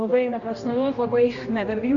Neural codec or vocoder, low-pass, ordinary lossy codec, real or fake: codec, 16 kHz, 1 kbps, X-Codec, HuBERT features, trained on general audio; 7.2 kHz; Opus, 64 kbps; fake